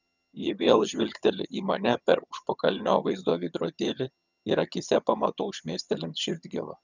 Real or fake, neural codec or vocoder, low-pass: fake; vocoder, 22.05 kHz, 80 mel bands, HiFi-GAN; 7.2 kHz